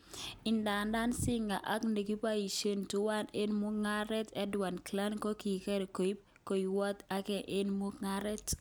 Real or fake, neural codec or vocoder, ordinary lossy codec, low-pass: real; none; none; none